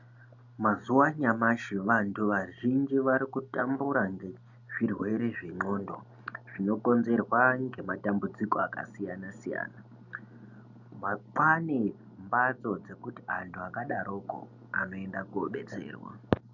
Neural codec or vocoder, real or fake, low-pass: none; real; 7.2 kHz